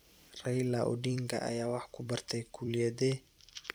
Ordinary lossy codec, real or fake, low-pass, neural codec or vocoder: none; fake; none; vocoder, 44.1 kHz, 128 mel bands every 256 samples, BigVGAN v2